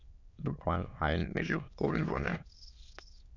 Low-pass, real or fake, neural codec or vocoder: 7.2 kHz; fake; autoencoder, 22.05 kHz, a latent of 192 numbers a frame, VITS, trained on many speakers